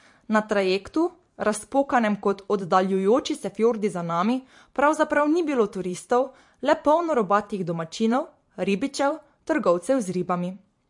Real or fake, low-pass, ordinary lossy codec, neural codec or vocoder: fake; 10.8 kHz; MP3, 48 kbps; vocoder, 44.1 kHz, 128 mel bands every 512 samples, BigVGAN v2